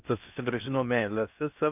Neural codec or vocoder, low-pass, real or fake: codec, 16 kHz in and 24 kHz out, 0.6 kbps, FocalCodec, streaming, 4096 codes; 3.6 kHz; fake